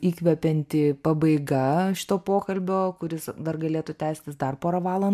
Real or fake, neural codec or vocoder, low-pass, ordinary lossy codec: real; none; 14.4 kHz; MP3, 96 kbps